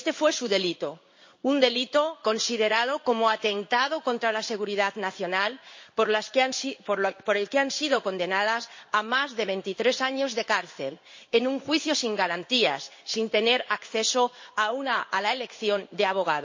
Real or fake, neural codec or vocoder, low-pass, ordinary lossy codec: fake; codec, 16 kHz in and 24 kHz out, 1 kbps, XY-Tokenizer; 7.2 kHz; MP3, 32 kbps